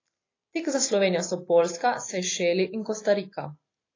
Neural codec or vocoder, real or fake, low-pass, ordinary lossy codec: none; real; 7.2 kHz; AAC, 32 kbps